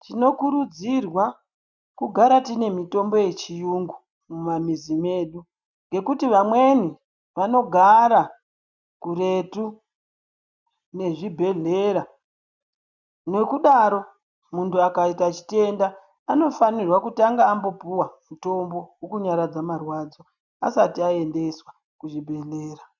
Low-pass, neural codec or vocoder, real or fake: 7.2 kHz; none; real